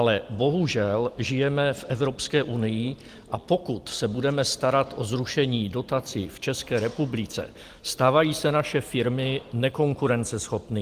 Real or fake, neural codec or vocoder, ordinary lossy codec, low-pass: real; none; Opus, 24 kbps; 14.4 kHz